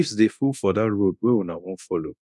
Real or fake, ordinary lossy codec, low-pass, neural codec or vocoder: fake; none; none; codec, 24 kHz, 0.9 kbps, DualCodec